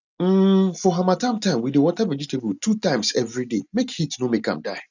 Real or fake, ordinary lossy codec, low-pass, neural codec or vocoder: real; none; 7.2 kHz; none